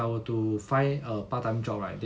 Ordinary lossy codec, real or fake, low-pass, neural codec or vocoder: none; real; none; none